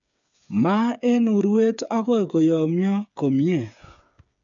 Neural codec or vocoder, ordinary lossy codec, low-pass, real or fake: codec, 16 kHz, 8 kbps, FreqCodec, smaller model; none; 7.2 kHz; fake